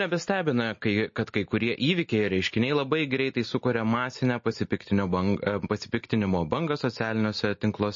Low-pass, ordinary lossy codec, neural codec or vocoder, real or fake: 7.2 kHz; MP3, 32 kbps; none; real